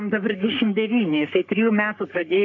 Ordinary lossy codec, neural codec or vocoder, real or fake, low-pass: MP3, 64 kbps; codec, 44.1 kHz, 3.4 kbps, Pupu-Codec; fake; 7.2 kHz